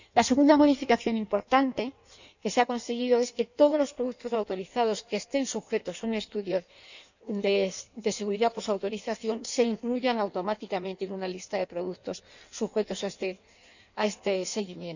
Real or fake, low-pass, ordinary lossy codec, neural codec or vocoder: fake; 7.2 kHz; none; codec, 16 kHz in and 24 kHz out, 1.1 kbps, FireRedTTS-2 codec